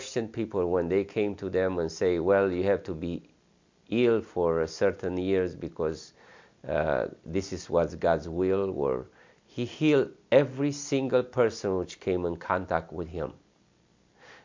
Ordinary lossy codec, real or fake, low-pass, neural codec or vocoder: MP3, 64 kbps; real; 7.2 kHz; none